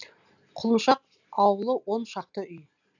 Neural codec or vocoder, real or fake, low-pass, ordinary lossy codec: none; real; 7.2 kHz; none